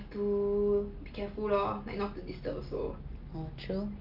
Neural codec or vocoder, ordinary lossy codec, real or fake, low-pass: none; Opus, 24 kbps; real; 5.4 kHz